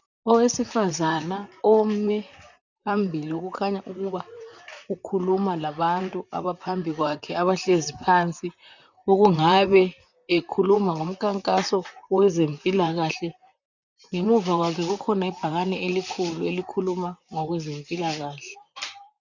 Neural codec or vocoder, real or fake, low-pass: vocoder, 44.1 kHz, 128 mel bands, Pupu-Vocoder; fake; 7.2 kHz